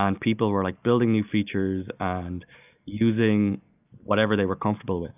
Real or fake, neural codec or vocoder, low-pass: real; none; 3.6 kHz